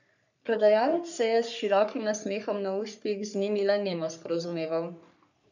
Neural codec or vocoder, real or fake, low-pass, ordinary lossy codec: codec, 44.1 kHz, 3.4 kbps, Pupu-Codec; fake; 7.2 kHz; none